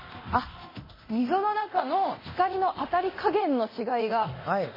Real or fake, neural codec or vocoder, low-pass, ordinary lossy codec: fake; codec, 24 kHz, 0.9 kbps, DualCodec; 5.4 kHz; MP3, 24 kbps